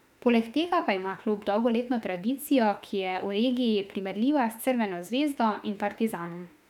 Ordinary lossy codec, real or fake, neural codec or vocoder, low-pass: none; fake; autoencoder, 48 kHz, 32 numbers a frame, DAC-VAE, trained on Japanese speech; 19.8 kHz